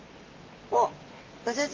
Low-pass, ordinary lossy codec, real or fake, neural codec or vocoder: 7.2 kHz; Opus, 32 kbps; real; none